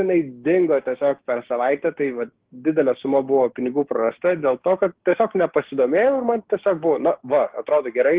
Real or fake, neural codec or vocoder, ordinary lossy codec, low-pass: fake; codec, 16 kHz, 6 kbps, DAC; Opus, 16 kbps; 3.6 kHz